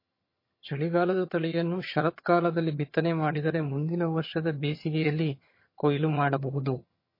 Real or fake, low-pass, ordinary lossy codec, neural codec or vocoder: fake; 5.4 kHz; MP3, 24 kbps; vocoder, 22.05 kHz, 80 mel bands, HiFi-GAN